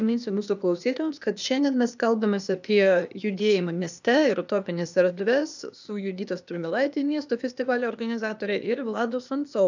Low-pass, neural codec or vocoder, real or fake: 7.2 kHz; codec, 16 kHz, 0.8 kbps, ZipCodec; fake